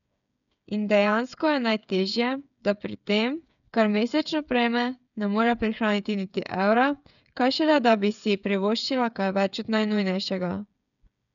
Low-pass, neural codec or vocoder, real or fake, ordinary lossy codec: 7.2 kHz; codec, 16 kHz, 8 kbps, FreqCodec, smaller model; fake; none